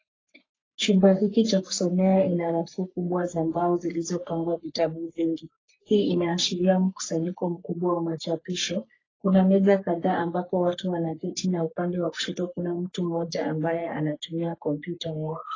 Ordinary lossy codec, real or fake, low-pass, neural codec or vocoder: AAC, 32 kbps; fake; 7.2 kHz; codec, 44.1 kHz, 3.4 kbps, Pupu-Codec